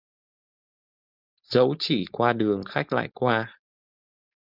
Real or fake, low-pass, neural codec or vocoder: fake; 5.4 kHz; codec, 16 kHz, 4.8 kbps, FACodec